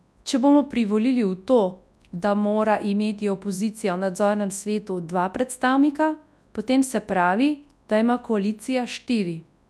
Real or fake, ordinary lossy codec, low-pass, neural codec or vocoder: fake; none; none; codec, 24 kHz, 0.9 kbps, WavTokenizer, large speech release